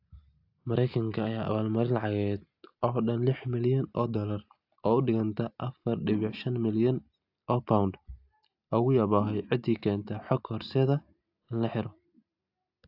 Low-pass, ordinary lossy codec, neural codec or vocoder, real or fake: 5.4 kHz; AAC, 48 kbps; none; real